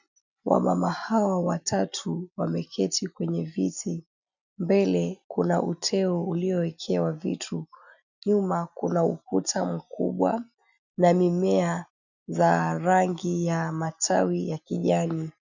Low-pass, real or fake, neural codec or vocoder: 7.2 kHz; real; none